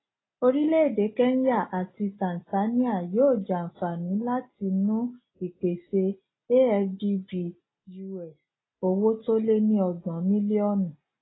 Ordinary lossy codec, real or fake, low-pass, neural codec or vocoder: AAC, 16 kbps; real; 7.2 kHz; none